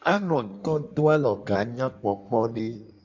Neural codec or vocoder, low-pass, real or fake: codec, 16 kHz in and 24 kHz out, 1.1 kbps, FireRedTTS-2 codec; 7.2 kHz; fake